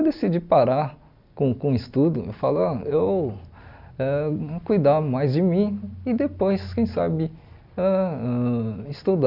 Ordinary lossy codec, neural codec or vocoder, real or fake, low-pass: none; none; real; 5.4 kHz